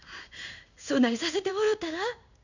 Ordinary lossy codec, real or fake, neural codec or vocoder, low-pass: none; fake; codec, 16 kHz in and 24 kHz out, 1 kbps, XY-Tokenizer; 7.2 kHz